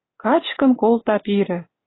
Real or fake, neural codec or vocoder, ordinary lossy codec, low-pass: real; none; AAC, 16 kbps; 7.2 kHz